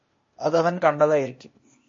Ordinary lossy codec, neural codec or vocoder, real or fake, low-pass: MP3, 32 kbps; codec, 16 kHz, 0.8 kbps, ZipCodec; fake; 7.2 kHz